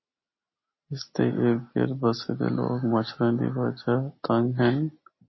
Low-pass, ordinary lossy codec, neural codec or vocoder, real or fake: 7.2 kHz; MP3, 24 kbps; none; real